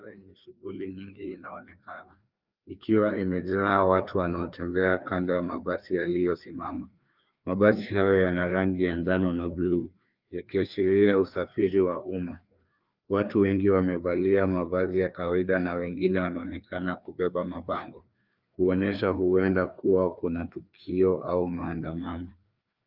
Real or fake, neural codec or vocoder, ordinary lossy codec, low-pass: fake; codec, 16 kHz, 2 kbps, FreqCodec, larger model; Opus, 24 kbps; 5.4 kHz